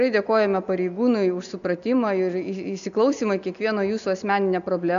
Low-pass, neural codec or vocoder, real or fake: 7.2 kHz; none; real